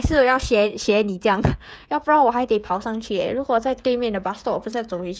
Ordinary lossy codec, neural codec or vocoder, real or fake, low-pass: none; codec, 16 kHz, 16 kbps, FreqCodec, smaller model; fake; none